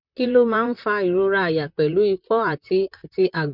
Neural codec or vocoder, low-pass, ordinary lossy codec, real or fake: vocoder, 44.1 kHz, 128 mel bands, Pupu-Vocoder; 5.4 kHz; none; fake